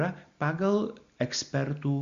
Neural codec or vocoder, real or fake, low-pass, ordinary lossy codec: none; real; 7.2 kHz; Opus, 64 kbps